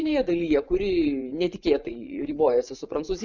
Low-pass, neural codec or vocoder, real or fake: 7.2 kHz; vocoder, 24 kHz, 100 mel bands, Vocos; fake